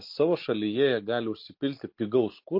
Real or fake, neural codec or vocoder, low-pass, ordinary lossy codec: real; none; 5.4 kHz; MP3, 32 kbps